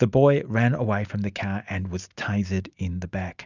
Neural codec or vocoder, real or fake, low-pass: none; real; 7.2 kHz